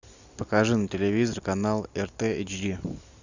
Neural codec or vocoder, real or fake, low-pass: none; real; 7.2 kHz